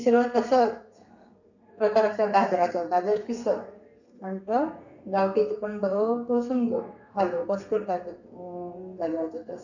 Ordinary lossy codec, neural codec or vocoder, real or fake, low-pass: none; codec, 32 kHz, 1.9 kbps, SNAC; fake; 7.2 kHz